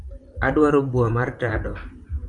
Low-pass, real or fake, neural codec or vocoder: 10.8 kHz; fake; vocoder, 44.1 kHz, 128 mel bands, Pupu-Vocoder